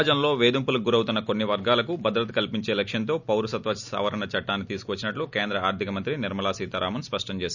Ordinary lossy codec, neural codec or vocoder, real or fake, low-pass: none; none; real; 7.2 kHz